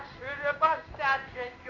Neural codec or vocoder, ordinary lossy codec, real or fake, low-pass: codec, 16 kHz in and 24 kHz out, 1 kbps, XY-Tokenizer; AAC, 48 kbps; fake; 7.2 kHz